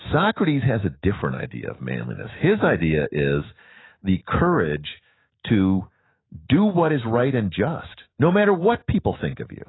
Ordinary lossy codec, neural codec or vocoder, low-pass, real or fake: AAC, 16 kbps; autoencoder, 48 kHz, 128 numbers a frame, DAC-VAE, trained on Japanese speech; 7.2 kHz; fake